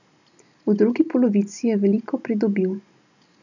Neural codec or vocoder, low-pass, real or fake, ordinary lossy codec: none; 7.2 kHz; real; none